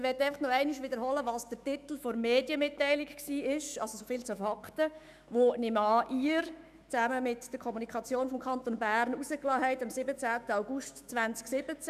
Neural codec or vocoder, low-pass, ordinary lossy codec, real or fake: autoencoder, 48 kHz, 128 numbers a frame, DAC-VAE, trained on Japanese speech; 14.4 kHz; none; fake